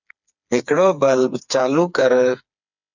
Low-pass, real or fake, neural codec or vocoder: 7.2 kHz; fake; codec, 16 kHz, 4 kbps, FreqCodec, smaller model